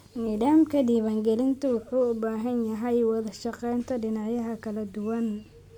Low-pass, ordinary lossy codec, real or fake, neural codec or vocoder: 19.8 kHz; MP3, 96 kbps; real; none